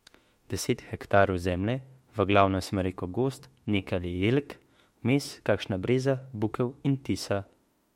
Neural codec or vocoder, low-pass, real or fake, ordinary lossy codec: autoencoder, 48 kHz, 32 numbers a frame, DAC-VAE, trained on Japanese speech; 19.8 kHz; fake; MP3, 64 kbps